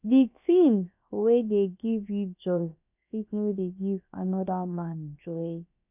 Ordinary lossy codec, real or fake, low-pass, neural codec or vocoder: none; fake; 3.6 kHz; codec, 16 kHz, about 1 kbps, DyCAST, with the encoder's durations